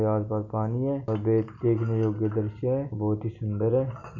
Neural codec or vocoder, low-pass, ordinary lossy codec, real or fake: none; 7.2 kHz; none; real